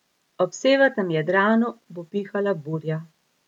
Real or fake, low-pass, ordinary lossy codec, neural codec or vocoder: real; 19.8 kHz; MP3, 96 kbps; none